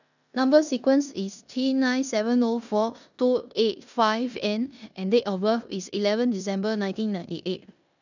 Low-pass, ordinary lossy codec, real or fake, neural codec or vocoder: 7.2 kHz; none; fake; codec, 16 kHz in and 24 kHz out, 0.9 kbps, LongCat-Audio-Codec, four codebook decoder